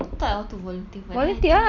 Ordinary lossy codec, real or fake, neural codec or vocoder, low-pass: none; real; none; 7.2 kHz